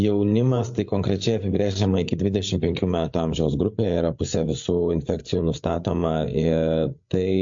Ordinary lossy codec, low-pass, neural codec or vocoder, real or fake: MP3, 48 kbps; 7.2 kHz; codec, 16 kHz, 8 kbps, FreqCodec, larger model; fake